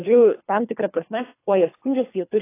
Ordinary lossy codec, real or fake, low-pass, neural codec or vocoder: AAC, 24 kbps; fake; 3.6 kHz; codec, 24 kHz, 3 kbps, HILCodec